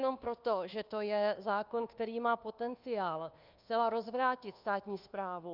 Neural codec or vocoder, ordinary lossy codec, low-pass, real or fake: codec, 24 kHz, 1.2 kbps, DualCodec; Opus, 24 kbps; 5.4 kHz; fake